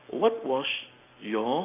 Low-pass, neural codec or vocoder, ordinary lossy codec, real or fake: 3.6 kHz; none; none; real